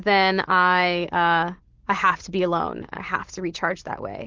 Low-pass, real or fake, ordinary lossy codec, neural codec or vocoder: 7.2 kHz; real; Opus, 16 kbps; none